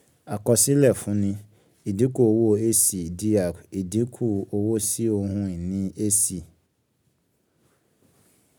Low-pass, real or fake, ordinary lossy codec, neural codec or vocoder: none; real; none; none